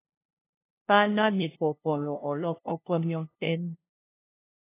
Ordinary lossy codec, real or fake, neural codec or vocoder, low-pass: AAC, 24 kbps; fake; codec, 16 kHz, 0.5 kbps, FunCodec, trained on LibriTTS, 25 frames a second; 3.6 kHz